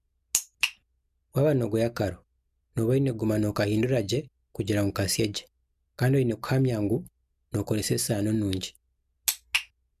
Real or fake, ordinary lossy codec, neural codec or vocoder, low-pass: real; MP3, 96 kbps; none; 14.4 kHz